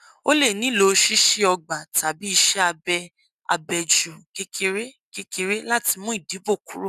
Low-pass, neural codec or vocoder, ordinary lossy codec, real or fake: 14.4 kHz; none; none; real